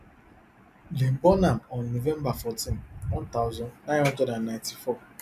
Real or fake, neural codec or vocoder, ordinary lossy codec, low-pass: real; none; none; 14.4 kHz